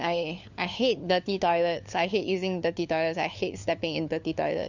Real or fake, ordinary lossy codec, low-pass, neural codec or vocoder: fake; Opus, 64 kbps; 7.2 kHz; codec, 16 kHz, 4 kbps, FunCodec, trained on LibriTTS, 50 frames a second